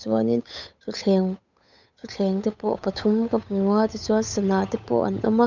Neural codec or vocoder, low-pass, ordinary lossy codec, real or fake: codec, 16 kHz, 8 kbps, FunCodec, trained on Chinese and English, 25 frames a second; 7.2 kHz; none; fake